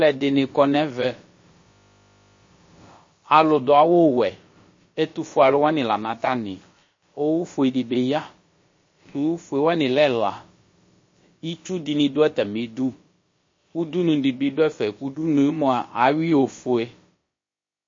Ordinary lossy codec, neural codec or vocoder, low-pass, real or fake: MP3, 32 kbps; codec, 16 kHz, about 1 kbps, DyCAST, with the encoder's durations; 7.2 kHz; fake